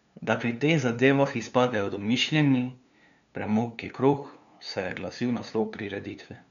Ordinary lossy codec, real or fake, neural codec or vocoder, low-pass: none; fake; codec, 16 kHz, 2 kbps, FunCodec, trained on LibriTTS, 25 frames a second; 7.2 kHz